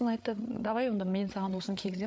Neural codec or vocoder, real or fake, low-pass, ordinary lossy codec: codec, 16 kHz, 8 kbps, FreqCodec, larger model; fake; none; none